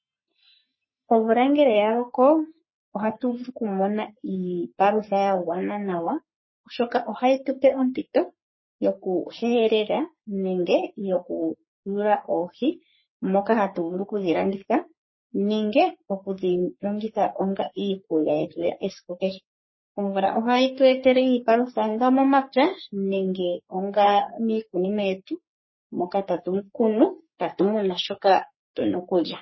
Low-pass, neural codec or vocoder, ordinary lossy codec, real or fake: 7.2 kHz; codec, 44.1 kHz, 3.4 kbps, Pupu-Codec; MP3, 24 kbps; fake